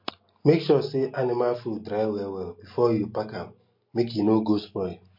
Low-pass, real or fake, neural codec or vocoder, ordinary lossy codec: 5.4 kHz; real; none; MP3, 32 kbps